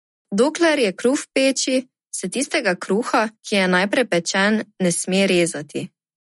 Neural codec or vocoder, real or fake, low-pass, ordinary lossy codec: none; real; 19.8 kHz; MP3, 48 kbps